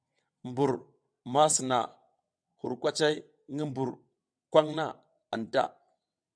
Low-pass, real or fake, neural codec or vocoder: 9.9 kHz; fake; vocoder, 22.05 kHz, 80 mel bands, WaveNeXt